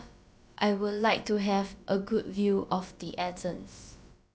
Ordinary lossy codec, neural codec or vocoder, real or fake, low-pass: none; codec, 16 kHz, about 1 kbps, DyCAST, with the encoder's durations; fake; none